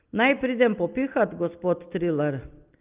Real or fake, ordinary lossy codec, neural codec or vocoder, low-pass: real; Opus, 32 kbps; none; 3.6 kHz